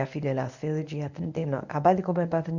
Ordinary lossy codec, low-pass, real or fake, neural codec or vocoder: none; 7.2 kHz; fake; codec, 24 kHz, 0.9 kbps, WavTokenizer, medium speech release version 1